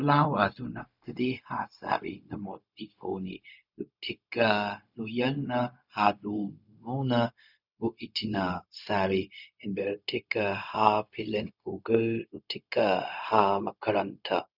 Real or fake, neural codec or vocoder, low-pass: fake; codec, 16 kHz, 0.4 kbps, LongCat-Audio-Codec; 5.4 kHz